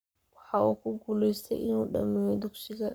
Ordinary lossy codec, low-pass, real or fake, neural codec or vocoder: none; none; fake; codec, 44.1 kHz, 7.8 kbps, Pupu-Codec